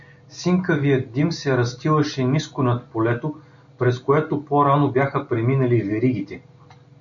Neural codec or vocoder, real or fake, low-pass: none; real; 7.2 kHz